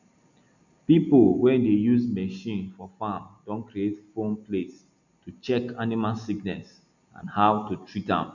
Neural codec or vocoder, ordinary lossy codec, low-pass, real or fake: none; none; 7.2 kHz; real